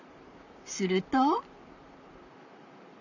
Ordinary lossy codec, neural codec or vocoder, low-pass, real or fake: none; vocoder, 44.1 kHz, 128 mel bands, Pupu-Vocoder; 7.2 kHz; fake